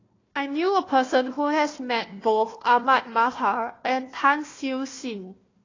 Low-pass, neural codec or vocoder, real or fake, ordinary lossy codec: 7.2 kHz; codec, 16 kHz, 1 kbps, FunCodec, trained on Chinese and English, 50 frames a second; fake; AAC, 32 kbps